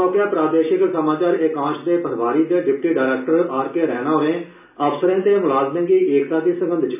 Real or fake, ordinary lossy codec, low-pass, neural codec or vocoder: real; none; 3.6 kHz; none